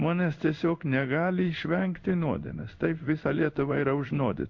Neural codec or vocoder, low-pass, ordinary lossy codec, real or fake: codec, 16 kHz in and 24 kHz out, 1 kbps, XY-Tokenizer; 7.2 kHz; MP3, 48 kbps; fake